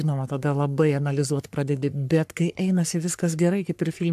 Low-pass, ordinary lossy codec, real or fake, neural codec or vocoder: 14.4 kHz; AAC, 96 kbps; fake; codec, 44.1 kHz, 3.4 kbps, Pupu-Codec